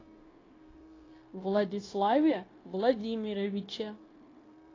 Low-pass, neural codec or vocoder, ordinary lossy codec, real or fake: 7.2 kHz; codec, 16 kHz, 0.9 kbps, LongCat-Audio-Codec; AAC, 32 kbps; fake